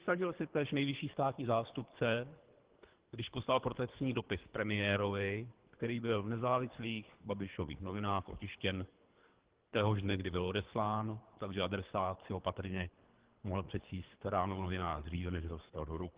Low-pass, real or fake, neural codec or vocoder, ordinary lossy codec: 3.6 kHz; fake; codec, 24 kHz, 3 kbps, HILCodec; Opus, 16 kbps